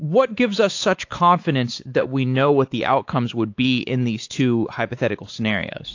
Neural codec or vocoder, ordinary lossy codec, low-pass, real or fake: codec, 16 kHz, 4 kbps, X-Codec, WavLM features, trained on Multilingual LibriSpeech; AAC, 48 kbps; 7.2 kHz; fake